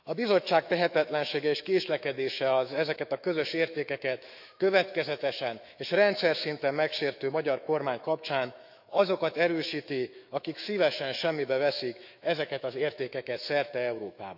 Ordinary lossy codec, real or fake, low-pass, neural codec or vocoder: none; fake; 5.4 kHz; autoencoder, 48 kHz, 128 numbers a frame, DAC-VAE, trained on Japanese speech